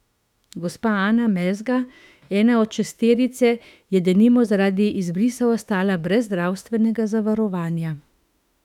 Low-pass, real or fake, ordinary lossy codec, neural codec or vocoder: 19.8 kHz; fake; none; autoencoder, 48 kHz, 32 numbers a frame, DAC-VAE, trained on Japanese speech